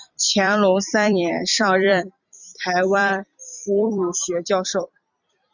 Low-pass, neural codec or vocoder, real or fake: 7.2 kHz; vocoder, 44.1 kHz, 128 mel bands every 256 samples, BigVGAN v2; fake